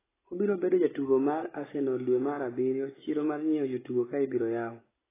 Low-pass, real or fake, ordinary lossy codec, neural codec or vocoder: 3.6 kHz; real; AAC, 16 kbps; none